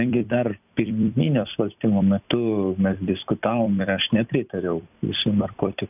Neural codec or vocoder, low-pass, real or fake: vocoder, 44.1 kHz, 128 mel bands every 256 samples, BigVGAN v2; 3.6 kHz; fake